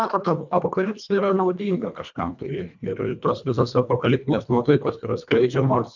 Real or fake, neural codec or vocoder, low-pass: fake; codec, 24 kHz, 1.5 kbps, HILCodec; 7.2 kHz